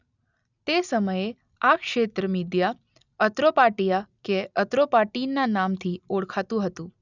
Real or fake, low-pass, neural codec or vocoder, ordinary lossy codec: real; 7.2 kHz; none; none